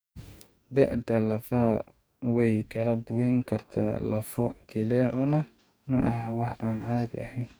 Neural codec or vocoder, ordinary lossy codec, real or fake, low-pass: codec, 44.1 kHz, 2.6 kbps, DAC; none; fake; none